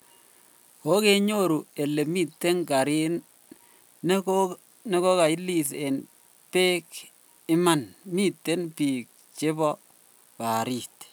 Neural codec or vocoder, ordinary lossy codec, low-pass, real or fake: none; none; none; real